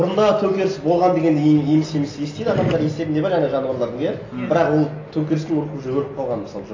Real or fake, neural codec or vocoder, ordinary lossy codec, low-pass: real; none; AAC, 32 kbps; 7.2 kHz